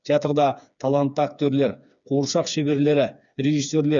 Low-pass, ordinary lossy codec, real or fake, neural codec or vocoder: 7.2 kHz; none; fake; codec, 16 kHz, 4 kbps, FreqCodec, smaller model